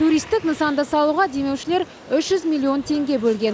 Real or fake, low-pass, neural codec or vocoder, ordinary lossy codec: real; none; none; none